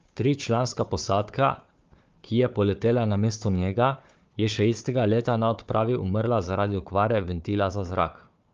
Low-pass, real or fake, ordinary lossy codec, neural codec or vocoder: 7.2 kHz; fake; Opus, 32 kbps; codec, 16 kHz, 4 kbps, FunCodec, trained on Chinese and English, 50 frames a second